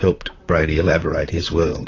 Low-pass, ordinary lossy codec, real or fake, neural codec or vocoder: 7.2 kHz; AAC, 32 kbps; fake; vocoder, 44.1 kHz, 128 mel bands, Pupu-Vocoder